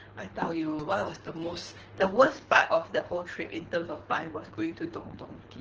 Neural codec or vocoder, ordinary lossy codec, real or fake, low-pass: codec, 24 kHz, 3 kbps, HILCodec; Opus, 16 kbps; fake; 7.2 kHz